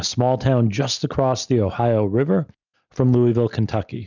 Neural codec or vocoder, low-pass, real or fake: none; 7.2 kHz; real